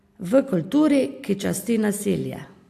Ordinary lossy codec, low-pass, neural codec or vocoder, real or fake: AAC, 64 kbps; 14.4 kHz; none; real